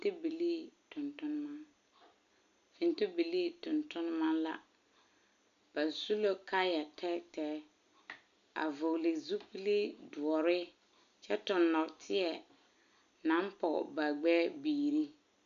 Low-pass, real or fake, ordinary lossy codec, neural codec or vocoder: 7.2 kHz; real; AAC, 96 kbps; none